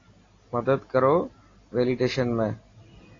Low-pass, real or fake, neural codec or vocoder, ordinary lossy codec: 7.2 kHz; real; none; AAC, 32 kbps